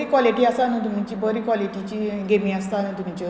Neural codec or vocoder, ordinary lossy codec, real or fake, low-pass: none; none; real; none